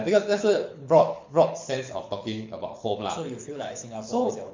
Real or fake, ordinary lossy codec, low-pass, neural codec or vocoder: fake; MP3, 48 kbps; 7.2 kHz; codec, 24 kHz, 6 kbps, HILCodec